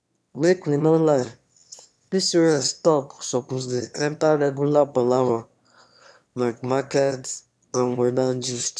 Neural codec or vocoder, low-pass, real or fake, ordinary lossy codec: autoencoder, 22.05 kHz, a latent of 192 numbers a frame, VITS, trained on one speaker; none; fake; none